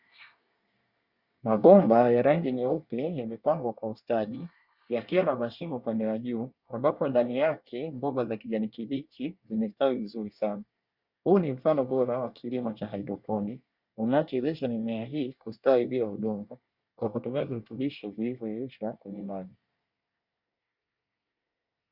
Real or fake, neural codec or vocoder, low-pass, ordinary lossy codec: fake; codec, 24 kHz, 1 kbps, SNAC; 5.4 kHz; Opus, 64 kbps